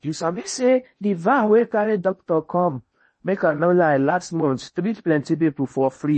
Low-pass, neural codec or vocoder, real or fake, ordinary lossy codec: 10.8 kHz; codec, 16 kHz in and 24 kHz out, 0.8 kbps, FocalCodec, streaming, 65536 codes; fake; MP3, 32 kbps